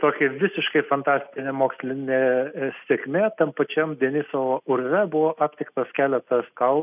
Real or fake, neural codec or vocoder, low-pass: real; none; 3.6 kHz